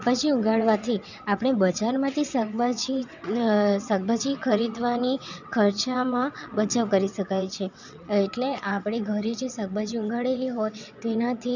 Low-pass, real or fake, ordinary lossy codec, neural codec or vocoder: 7.2 kHz; fake; none; vocoder, 22.05 kHz, 80 mel bands, WaveNeXt